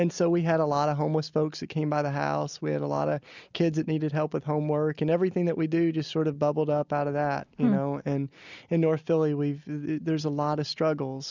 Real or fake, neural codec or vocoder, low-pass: real; none; 7.2 kHz